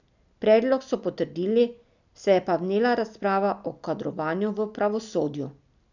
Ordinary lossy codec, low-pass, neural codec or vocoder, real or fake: none; 7.2 kHz; none; real